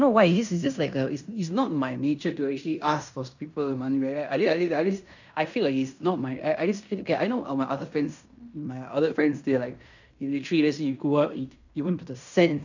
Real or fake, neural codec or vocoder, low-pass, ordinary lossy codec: fake; codec, 16 kHz in and 24 kHz out, 0.9 kbps, LongCat-Audio-Codec, fine tuned four codebook decoder; 7.2 kHz; none